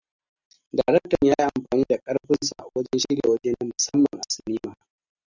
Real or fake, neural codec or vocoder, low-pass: real; none; 7.2 kHz